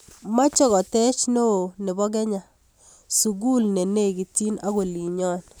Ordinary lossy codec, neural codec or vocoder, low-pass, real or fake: none; none; none; real